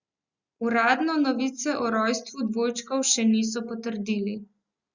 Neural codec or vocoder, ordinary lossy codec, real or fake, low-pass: none; Opus, 64 kbps; real; 7.2 kHz